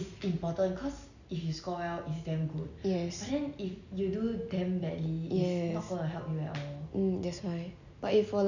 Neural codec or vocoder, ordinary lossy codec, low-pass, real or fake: none; none; 7.2 kHz; real